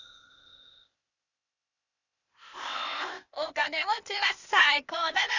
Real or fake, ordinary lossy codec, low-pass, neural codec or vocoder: fake; none; 7.2 kHz; codec, 16 kHz, 0.8 kbps, ZipCodec